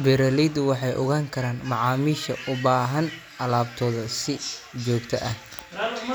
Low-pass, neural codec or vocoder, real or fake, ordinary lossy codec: none; none; real; none